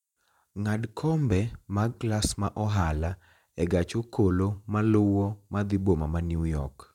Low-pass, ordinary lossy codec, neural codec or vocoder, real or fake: 19.8 kHz; none; vocoder, 44.1 kHz, 128 mel bands every 512 samples, BigVGAN v2; fake